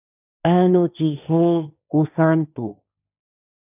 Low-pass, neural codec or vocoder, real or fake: 3.6 kHz; codec, 16 kHz, 1.1 kbps, Voila-Tokenizer; fake